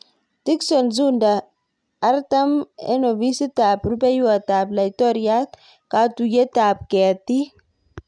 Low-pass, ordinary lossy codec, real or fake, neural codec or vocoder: 9.9 kHz; none; real; none